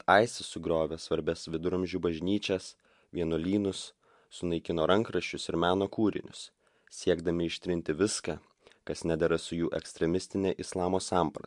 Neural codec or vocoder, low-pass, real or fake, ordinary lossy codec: none; 10.8 kHz; real; MP3, 64 kbps